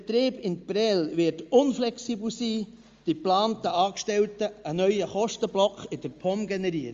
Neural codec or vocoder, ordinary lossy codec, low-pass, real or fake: none; Opus, 32 kbps; 7.2 kHz; real